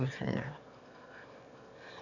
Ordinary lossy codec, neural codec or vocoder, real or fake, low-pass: none; autoencoder, 22.05 kHz, a latent of 192 numbers a frame, VITS, trained on one speaker; fake; 7.2 kHz